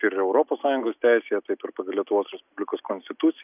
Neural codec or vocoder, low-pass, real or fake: none; 3.6 kHz; real